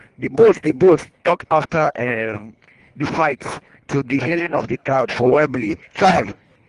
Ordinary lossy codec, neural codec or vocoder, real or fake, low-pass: Opus, 32 kbps; codec, 24 kHz, 1.5 kbps, HILCodec; fake; 10.8 kHz